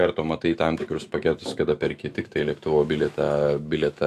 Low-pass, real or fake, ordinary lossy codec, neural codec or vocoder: 14.4 kHz; fake; AAC, 64 kbps; autoencoder, 48 kHz, 128 numbers a frame, DAC-VAE, trained on Japanese speech